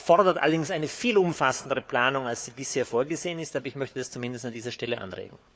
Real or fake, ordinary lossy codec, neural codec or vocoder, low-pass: fake; none; codec, 16 kHz, 4 kbps, FunCodec, trained on Chinese and English, 50 frames a second; none